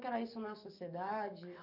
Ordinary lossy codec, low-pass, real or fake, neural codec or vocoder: none; 5.4 kHz; fake; codec, 44.1 kHz, 7.8 kbps, DAC